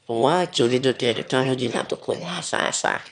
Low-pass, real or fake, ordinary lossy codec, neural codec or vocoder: 9.9 kHz; fake; none; autoencoder, 22.05 kHz, a latent of 192 numbers a frame, VITS, trained on one speaker